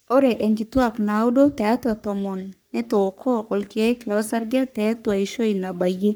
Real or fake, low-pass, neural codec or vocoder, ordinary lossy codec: fake; none; codec, 44.1 kHz, 3.4 kbps, Pupu-Codec; none